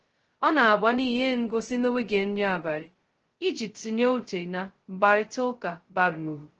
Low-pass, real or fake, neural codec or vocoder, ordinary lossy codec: 7.2 kHz; fake; codec, 16 kHz, 0.2 kbps, FocalCodec; Opus, 16 kbps